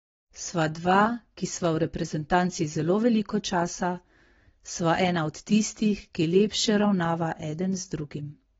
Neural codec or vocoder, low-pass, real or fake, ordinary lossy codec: none; 7.2 kHz; real; AAC, 24 kbps